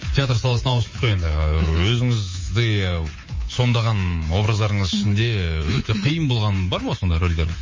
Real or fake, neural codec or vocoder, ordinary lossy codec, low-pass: fake; codec, 24 kHz, 3.1 kbps, DualCodec; MP3, 32 kbps; 7.2 kHz